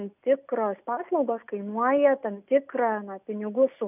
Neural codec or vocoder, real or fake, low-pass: none; real; 3.6 kHz